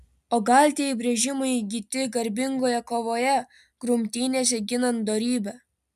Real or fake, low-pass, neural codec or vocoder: real; 14.4 kHz; none